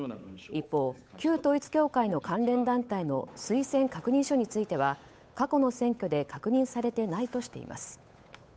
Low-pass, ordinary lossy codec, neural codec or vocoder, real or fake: none; none; codec, 16 kHz, 8 kbps, FunCodec, trained on Chinese and English, 25 frames a second; fake